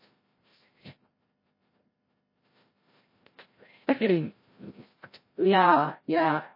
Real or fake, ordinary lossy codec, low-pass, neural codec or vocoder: fake; MP3, 24 kbps; 5.4 kHz; codec, 16 kHz, 0.5 kbps, FreqCodec, larger model